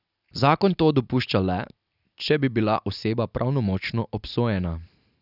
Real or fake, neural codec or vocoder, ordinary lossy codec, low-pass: fake; vocoder, 44.1 kHz, 128 mel bands every 256 samples, BigVGAN v2; none; 5.4 kHz